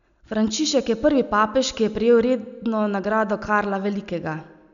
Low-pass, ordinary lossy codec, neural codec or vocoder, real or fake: 7.2 kHz; none; none; real